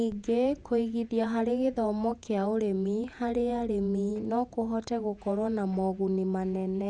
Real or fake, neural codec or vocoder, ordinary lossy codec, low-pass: fake; vocoder, 48 kHz, 128 mel bands, Vocos; none; 10.8 kHz